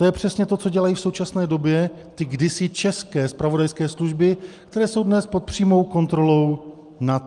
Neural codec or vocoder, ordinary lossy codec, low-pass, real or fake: none; Opus, 32 kbps; 10.8 kHz; real